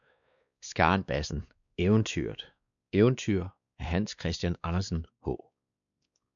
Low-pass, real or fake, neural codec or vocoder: 7.2 kHz; fake; codec, 16 kHz, 2 kbps, X-Codec, WavLM features, trained on Multilingual LibriSpeech